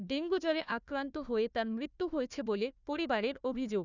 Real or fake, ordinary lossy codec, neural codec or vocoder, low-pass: fake; none; codec, 16 kHz, 1 kbps, FunCodec, trained on Chinese and English, 50 frames a second; 7.2 kHz